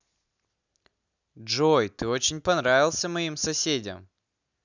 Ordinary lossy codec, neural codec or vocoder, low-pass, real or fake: none; none; 7.2 kHz; real